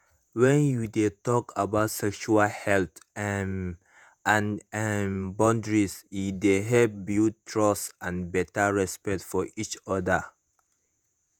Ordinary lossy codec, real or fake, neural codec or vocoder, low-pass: none; fake; vocoder, 48 kHz, 128 mel bands, Vocos; none